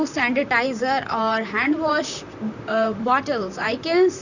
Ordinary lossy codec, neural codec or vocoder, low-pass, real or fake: none; vocoder, 44.1 kHz, 128 mel bands, Pupu-Vocoder; 7.2 kHz; fake